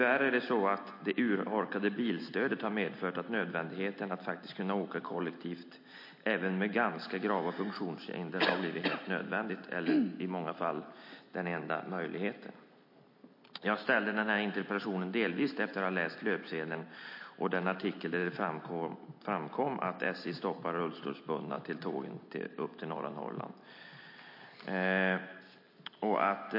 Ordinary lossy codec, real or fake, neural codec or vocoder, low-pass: MP3, 32 kbps; real; none; 5.4 kHz